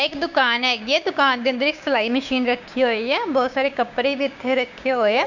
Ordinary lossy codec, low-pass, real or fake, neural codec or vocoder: none; 7.2 kHz; fake; codec, 16 kHz, 6 kbps, DAC